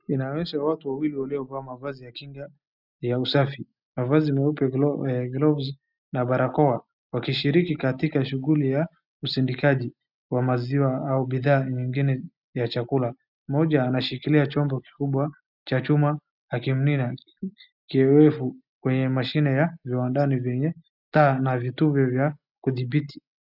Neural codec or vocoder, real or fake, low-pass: none; real; 5.4 kHz